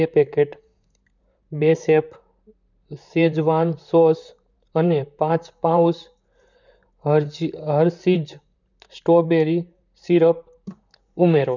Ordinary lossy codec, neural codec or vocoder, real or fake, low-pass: none; vocoder, 44.1 kHz, 128 mel bands, Pupu-Vocoder; fake; 7.2 kHz